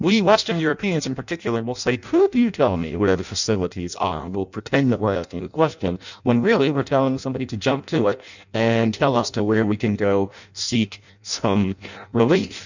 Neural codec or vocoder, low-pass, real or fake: codec, 16 kHz in and 24 kHz out, 0.6 kbps, FireRedTTS-2 codec; 7.2 kHz; fake